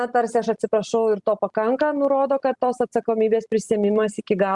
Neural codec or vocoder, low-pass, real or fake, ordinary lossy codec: none; 10.8 kHz; real; Opus, 64 kbps